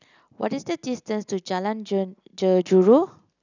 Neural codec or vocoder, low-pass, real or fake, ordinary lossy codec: none; 7.2 kHz; real; none